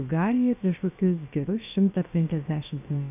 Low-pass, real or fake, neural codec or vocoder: 3.6 kHz; fake; codec, 16 kHz, about 1 kbps, DyCAST, with the encoder's durations